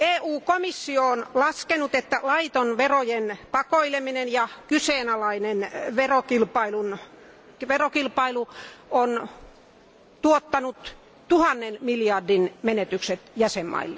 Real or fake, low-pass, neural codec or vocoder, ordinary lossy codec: real; none; none; none